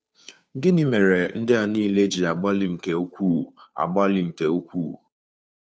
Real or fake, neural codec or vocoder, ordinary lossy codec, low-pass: fake; codec, 16 kHz, 2 kbps, FunCodec, trained on Chinese and English, 25 frames a second; none; none